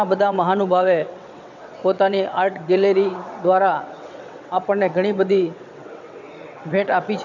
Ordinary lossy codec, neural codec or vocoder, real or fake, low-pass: none; vocoder, 22.05 kHz, 80 mel bands, WaveNeXt; fake; 7.2 kHz